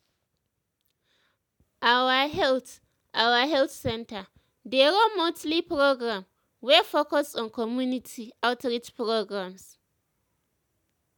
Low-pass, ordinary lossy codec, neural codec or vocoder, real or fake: 19.8 kHz; none; none; real